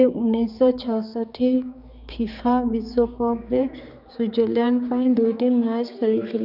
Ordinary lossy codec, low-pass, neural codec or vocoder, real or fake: none; 5.4 kHz; codec, 16 kHz, 4 kbps, X-Codec, HuBERT features, trained on general audio; fake